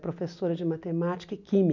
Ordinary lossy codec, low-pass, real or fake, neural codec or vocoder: none; 7.2 kHz; real; none